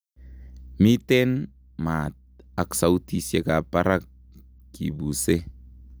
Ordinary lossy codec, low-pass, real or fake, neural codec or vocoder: none; none; real; none